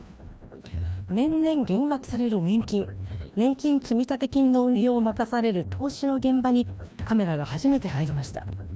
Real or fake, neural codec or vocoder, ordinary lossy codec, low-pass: fake; codec, 16 kHz, 1 kbps, FreqCodec, larger model; none; none